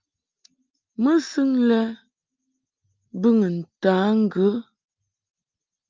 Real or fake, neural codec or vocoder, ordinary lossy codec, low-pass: real; none; Opus, 24 kbps; 7.2 kHz